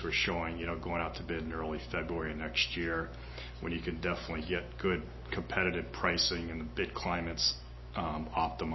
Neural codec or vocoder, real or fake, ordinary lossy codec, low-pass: none; real; MP3, 24 kbps; 7.2 kHz